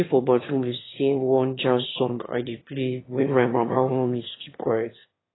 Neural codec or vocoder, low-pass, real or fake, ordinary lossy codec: autoencoder, 22.05 kHz, a latent of 192 numbers a frame, VITS, trained on one speaker; 7.2 kHz; fake; AAC, 16 kbps